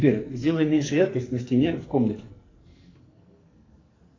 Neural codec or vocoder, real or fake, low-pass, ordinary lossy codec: codec, 44.1 kHz, 2.6 kbps, SNAC; fake; 7.2 kHz; AAC, 32 kbps